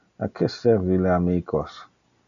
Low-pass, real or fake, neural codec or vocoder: 7.2 kHz; real; none